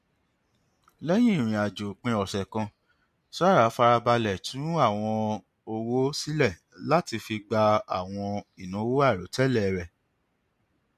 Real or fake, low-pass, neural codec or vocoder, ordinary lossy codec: real; 14.4 kHz; none; MP3, 64 kbps